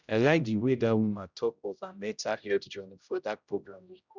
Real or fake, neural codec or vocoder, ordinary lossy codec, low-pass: fake; codec, 16 kHz, 0.5 kbps, X-Codec, HuBERT features, trained on general audio; Opus, 64 kbps; 7.2 kHz